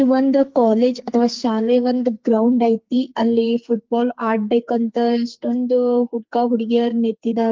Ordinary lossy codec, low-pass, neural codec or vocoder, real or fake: Opus, 32 kbps; 7.2 kHz; codec, 44.1 kHz, 2.6 kbps, SNAC; fake